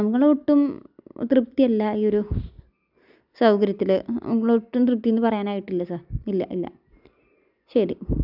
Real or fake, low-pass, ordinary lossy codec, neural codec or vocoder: real; 5.4 kHz; none; none